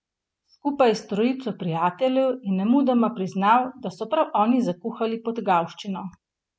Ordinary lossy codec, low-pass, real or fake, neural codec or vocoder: none; none; real; none